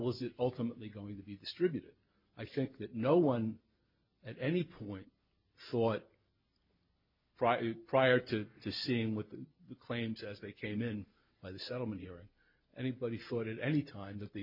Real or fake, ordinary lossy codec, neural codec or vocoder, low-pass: real; MP3, 48 kbps; none; 5.4 kHz